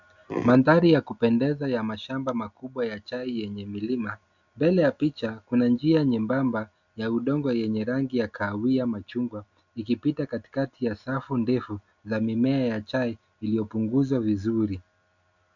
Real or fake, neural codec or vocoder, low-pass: real; none; 7.2 kHz